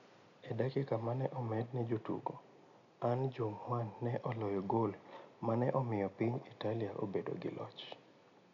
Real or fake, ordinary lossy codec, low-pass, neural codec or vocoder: real; none; 7.2 kHz; none